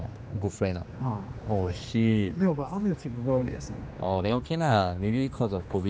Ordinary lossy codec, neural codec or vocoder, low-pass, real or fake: none; codec, 16 kHz, 2 kbps, X-Codec, HuBERT features, trained on general audio; none; fake